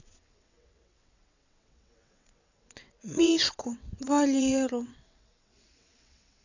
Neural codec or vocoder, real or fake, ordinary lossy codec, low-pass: vocoder, 22.05 kHz, 80 mel bands, WaveNeXt; fake; none; 7.2 kHz